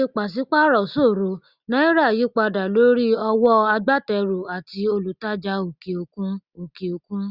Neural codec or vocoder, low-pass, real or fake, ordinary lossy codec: none; 5.4 kHz; real; Opus, 64 kbps